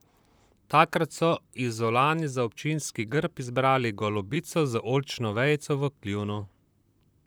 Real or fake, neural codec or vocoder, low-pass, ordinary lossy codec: fake; vocoder, 44.1 kHz, 128 mel bands, Pupu-Vocoder; none; none